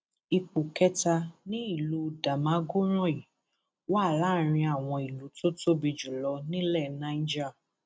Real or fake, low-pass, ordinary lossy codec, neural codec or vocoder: real; none; none; none